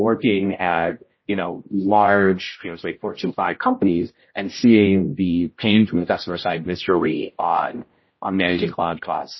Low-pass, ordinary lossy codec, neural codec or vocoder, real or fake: 7.2 kHz; MP3, 24 kbps; codec, 16 kHz, 0.5 kbps, X-Codec, HuBERT features, trained on general audio; fake